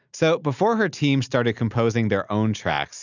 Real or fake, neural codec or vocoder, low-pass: real; none; 7.2 kHz